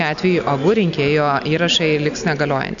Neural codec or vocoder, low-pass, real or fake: none; 7.2 kHz; real